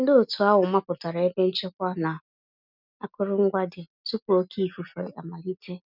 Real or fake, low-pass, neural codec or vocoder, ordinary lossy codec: real; 5.4 kHz; none; none